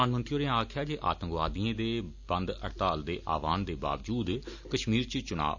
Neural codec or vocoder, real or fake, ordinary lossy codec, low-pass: none; real; none; 7.2 kHz